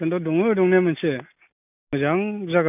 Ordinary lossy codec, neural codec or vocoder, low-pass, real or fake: none; none; 3.6 kHz; real